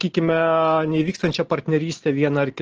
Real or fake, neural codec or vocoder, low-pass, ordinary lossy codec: real; none; 7.2 kHz; Opus, 16 kbps